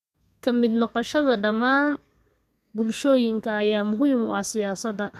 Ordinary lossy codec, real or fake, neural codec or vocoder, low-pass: none; fake; codec, 32 kHz, 1.9 kbps, SNAC; 14.4 kHz